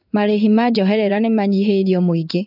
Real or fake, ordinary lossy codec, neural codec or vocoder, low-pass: fake; none; codec, 16 kHz in and 24 kHz out, 1 kbps, XY-Tokenizer; 5.4 kHz